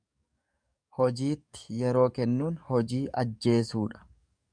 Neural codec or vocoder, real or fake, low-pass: codec, 44.1 kHz, 7.8 kbps, DAC; fake; 9.9 kHz